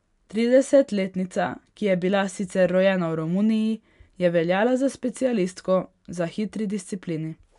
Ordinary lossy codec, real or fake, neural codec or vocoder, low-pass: none; real; none; 10.8 kHz